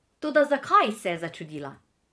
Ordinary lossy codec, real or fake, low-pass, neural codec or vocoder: none; fake; none; vocoder, 22.05 kHz, 80 mel bands, Vocos